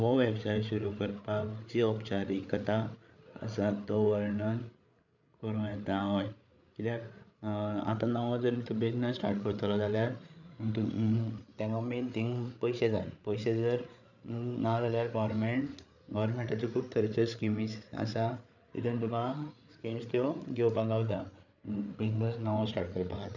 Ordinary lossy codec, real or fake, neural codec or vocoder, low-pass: none; fake; codec, 16 kHz, 8 kbps, FreqCodec, larger model; 7.2 kHz